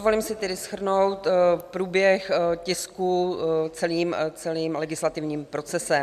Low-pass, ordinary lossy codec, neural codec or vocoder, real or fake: 14.4 kHz; MP3, 96 kbps; none; real